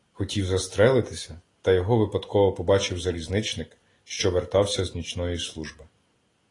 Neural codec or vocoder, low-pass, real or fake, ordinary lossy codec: none; 10.8 kHz; real; AAC, 32 kbps